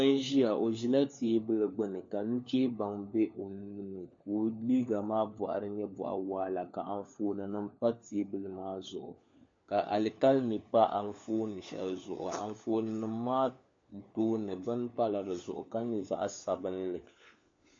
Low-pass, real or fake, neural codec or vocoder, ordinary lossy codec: 7.2 kHz; fake; codec, 16 kHz, 4 kbps, FunCodec, trained on LibriTTS, 50 frames a second; MP3, 48 kbps